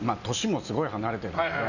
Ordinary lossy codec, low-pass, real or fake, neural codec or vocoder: none; 7.2 kHz; real; none